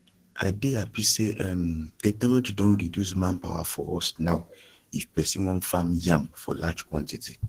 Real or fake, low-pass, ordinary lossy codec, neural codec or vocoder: fake; 14.4 kHz; Opus, 16 kbps; codec, 32 kHz, 1.9 kbps, SNAC